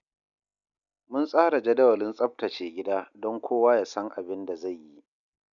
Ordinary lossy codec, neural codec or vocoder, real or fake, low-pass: none; none; real; 7.2 kHz